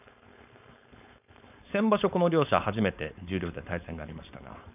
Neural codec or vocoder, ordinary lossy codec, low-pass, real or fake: codec, 16 kHz, 4.8 kbps, FACodec; none; 3.6 kHz; fake